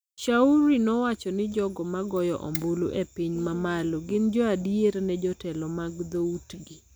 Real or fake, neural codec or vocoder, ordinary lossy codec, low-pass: real; none; none; none